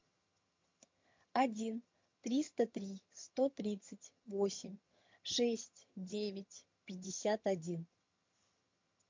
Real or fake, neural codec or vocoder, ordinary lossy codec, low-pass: fake; vocoder, 22.05 kHz, 80 mel bands, HiFi-GAN; MP3, 48 kbps; 7.2 kHz